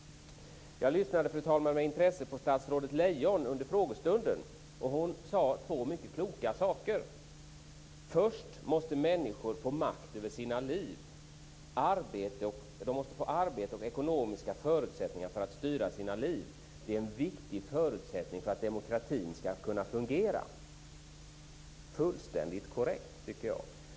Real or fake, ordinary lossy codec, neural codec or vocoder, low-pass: real; none; none; none